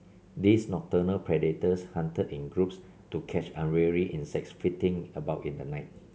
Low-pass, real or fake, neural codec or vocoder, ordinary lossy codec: none; real; none; none